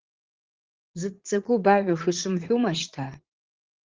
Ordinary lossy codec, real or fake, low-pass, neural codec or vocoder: Opus, 16 kbps; fake; 7.2 kHz; vocoder, 44.1 kHz, 80 mel bands, Vocos